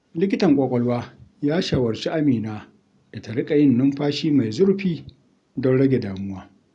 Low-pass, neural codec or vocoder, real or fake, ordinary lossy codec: 10.8 kHz; none; real; none